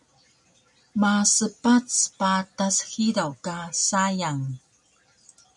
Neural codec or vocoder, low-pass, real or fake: none; 10.8 kHz; real